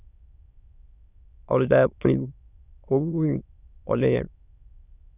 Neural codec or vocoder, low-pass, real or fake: autoencoder, 22.05 kHz, a latent of 192 numbers a frame, VITS, trained on many speakers; 3.6 kHz; fake